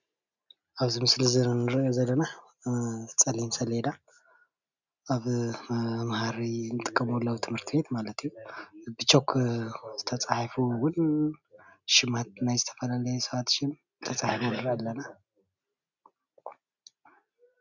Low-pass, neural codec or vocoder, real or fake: 7.2 kHz; none; real